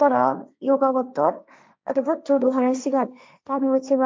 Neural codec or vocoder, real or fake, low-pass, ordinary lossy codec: codec, 16 kHz, 1.1 kbps, Voila-Tokenizer; fake; none; none